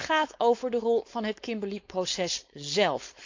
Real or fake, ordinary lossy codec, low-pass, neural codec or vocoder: fake; MP3, 64 kbps; 7.2 kHz; codec, 16 kHz, 4.8 kbps, FACodec